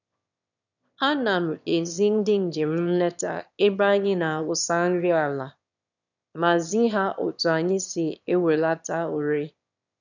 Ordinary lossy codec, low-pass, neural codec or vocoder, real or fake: none; 7.2 kHz; autoencoder, 22.05 kHz, a latent of 192 numbers a frame, VITS, trained on one speaker; fake